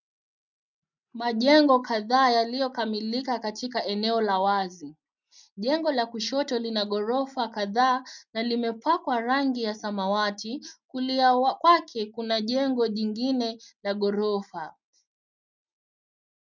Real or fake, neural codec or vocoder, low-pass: real; none; 7.2 kHz